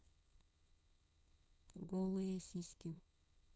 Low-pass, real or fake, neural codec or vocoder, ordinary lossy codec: none; fake; codec, 16 kHz, 4.8 kbps, FACodec; none